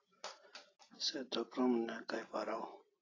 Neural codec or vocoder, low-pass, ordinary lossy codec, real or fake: none; 7.2 kHz; AAC, 32 kbps; real